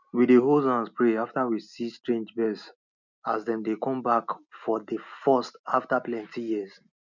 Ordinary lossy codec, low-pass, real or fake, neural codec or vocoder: none; 7.2 kHz; real; none